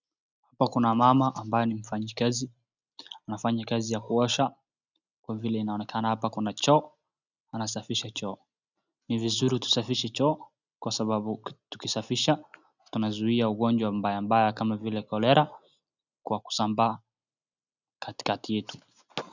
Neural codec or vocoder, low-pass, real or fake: none; 7.2 kHz; real